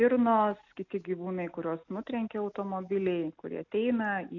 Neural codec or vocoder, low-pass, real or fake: none; 7.2 kHz; real